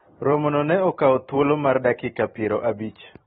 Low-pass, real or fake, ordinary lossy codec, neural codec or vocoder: 19.8 kHz; fake; AAC, 16 kbps; vocoder, 44.1 kHz, 128 mel bands every 256 samples, BigVGAN v2